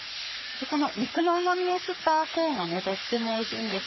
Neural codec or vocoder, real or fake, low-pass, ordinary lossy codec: codec, 44.1 kHz, 3.4 kbps, Pupu-Codec; fake; 7.2 kHz; MP3, 24 kbps